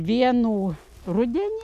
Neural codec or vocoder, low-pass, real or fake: none; 14.4 kHz; real